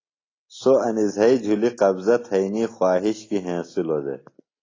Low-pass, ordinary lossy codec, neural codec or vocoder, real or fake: 7.2 kHz; AAC, 32 kbps; none; real